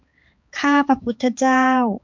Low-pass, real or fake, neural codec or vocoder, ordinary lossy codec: 7.2 kHz; fake; codec, 16 kHz, 4 kbps, X-Codec, HuBERT features, trained on balanced general audio; MP3, 64 kbps